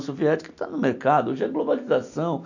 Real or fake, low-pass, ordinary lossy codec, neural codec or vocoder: real; 7.2 kHz; none; none